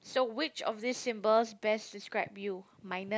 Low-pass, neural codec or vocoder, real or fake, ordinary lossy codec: none; none; real; none